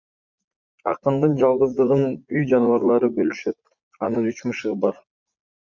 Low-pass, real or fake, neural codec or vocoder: 7.2 kHz; fake; vocoder, 44.1 kHz, 80 mel bands, Vocos